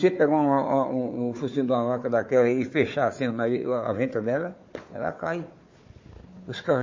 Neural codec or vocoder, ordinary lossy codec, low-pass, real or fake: codec, 16 kHz, 4 kbps, FunCodec, trained on Chinese and English, 50 frames a second; MP3, 32 kbps; 7.2 kHz; fake